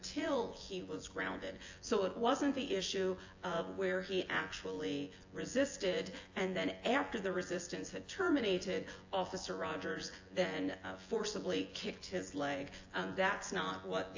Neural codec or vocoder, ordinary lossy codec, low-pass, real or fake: vocoder, 24 kHz, 100 mel bands, Vocos; AAC, 48 kbps; 7.2 kHz; fake